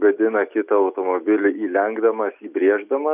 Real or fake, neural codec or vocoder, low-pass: real; none; 3.6 kHz